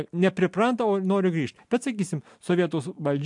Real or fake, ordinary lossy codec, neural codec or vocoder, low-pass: real; MP3, 64 kbps; none; 10.8 kHz